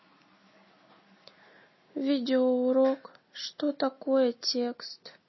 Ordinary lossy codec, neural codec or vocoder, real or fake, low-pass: MP3, 24 kbps; none; real; 7.2 kHz